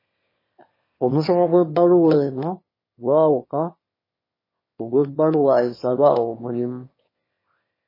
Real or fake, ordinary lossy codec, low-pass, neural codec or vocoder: fake; MP3, 24 kbps; 5.4 kHz; autoencoder, 22.05 kHz, a latent of 192 numbers a frame, VITS, trained on one speaker